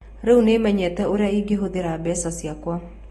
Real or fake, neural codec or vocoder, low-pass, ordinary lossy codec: real; none; 19.8 kHz; AAC, 32 kbps